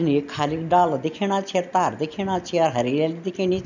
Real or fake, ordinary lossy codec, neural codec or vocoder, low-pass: real; none; none; 7.2 kHz